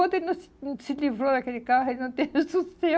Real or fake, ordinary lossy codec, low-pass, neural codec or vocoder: real; none; none; none